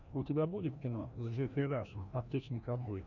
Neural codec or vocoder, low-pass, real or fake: codec, 16 kHz, 1 kbps, FreqCodec, larger model; 7.2 kHz; fake